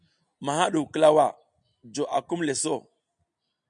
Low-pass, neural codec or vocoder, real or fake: 9.9 kHz; none; real